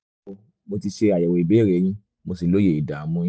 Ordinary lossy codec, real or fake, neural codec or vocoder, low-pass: none; real; none; none